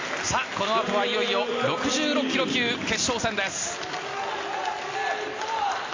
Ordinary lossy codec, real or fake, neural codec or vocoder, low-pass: AAC, 32 kbps; real; none; 7.2 kHz